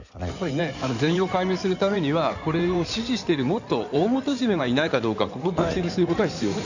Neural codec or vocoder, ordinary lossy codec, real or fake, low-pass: codec, 16 kHz in and 24 kHz out, 2.2 kbps, FireRedTTS-2 codec; none; fake; 7.2 kHz